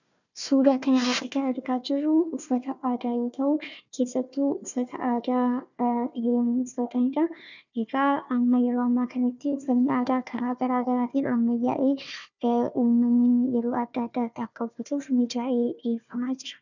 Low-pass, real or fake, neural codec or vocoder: 7.2 kHz; fake; codec, 16 kHz, 1 kbps, FunCodec, trained on Chinese and English, 50 frames a second